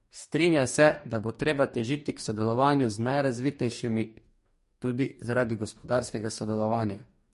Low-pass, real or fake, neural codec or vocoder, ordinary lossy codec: 14.4 kHz; fake; codec, 44.1 kHz, 2.6 kbps, DAC; MP3, 48 kbps